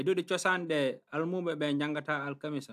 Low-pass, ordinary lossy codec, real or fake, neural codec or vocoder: 14.4 kHz; AAC, 96 kbps; real; none